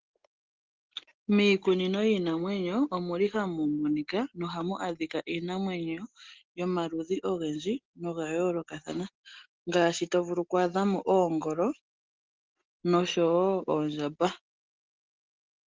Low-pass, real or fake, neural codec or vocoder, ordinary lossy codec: 7.2 kHz; real; none; Opus, 16 kbps